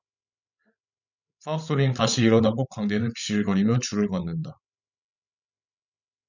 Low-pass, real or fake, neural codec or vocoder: 7.2 kHz; fake; codec, 16 kHz, 16 kbps, FreqCodec, larger model